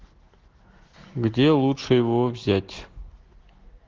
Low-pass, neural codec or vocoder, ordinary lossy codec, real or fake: 7.2 kHz; none; Opus, 16 kbps; real